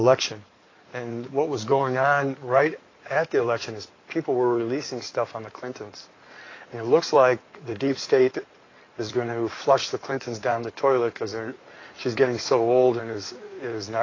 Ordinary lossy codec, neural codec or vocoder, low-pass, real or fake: AAC, 32 kbps; codec, 16 kHz in and 24 kHz out, 2.2 kbps, FireRedTTS-2 codec; 7.2 kHz; fake